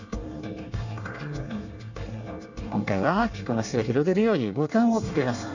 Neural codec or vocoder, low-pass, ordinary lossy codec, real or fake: codec, 24 kHz, 1 kbps, SNAC; 7.2 kHz; none; fake